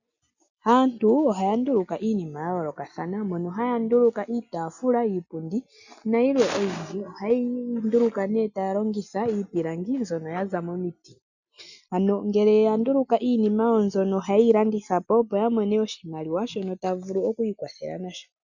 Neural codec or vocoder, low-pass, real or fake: none; 7.2 kHz; real